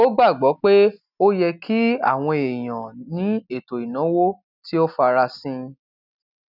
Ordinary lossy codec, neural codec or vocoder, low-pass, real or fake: none; none; 5.4 kHz; real